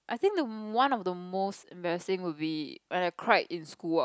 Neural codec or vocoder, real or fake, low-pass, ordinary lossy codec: none; real; none; none